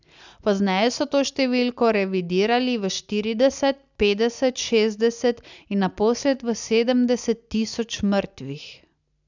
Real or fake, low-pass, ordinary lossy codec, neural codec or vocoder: real; 7.2 kHz; none; none